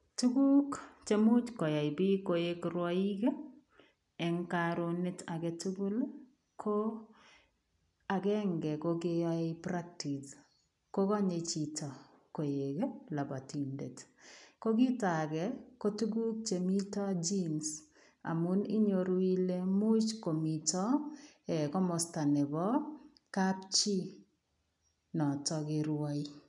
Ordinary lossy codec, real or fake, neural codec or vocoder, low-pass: MP3, 96 kbps; real; none; 10.8 kHz